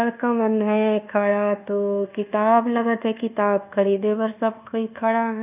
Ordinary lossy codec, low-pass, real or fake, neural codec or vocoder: none; 3.6 kHz; fake; codec, 24 kHz, 1.2 kbps, DualCodec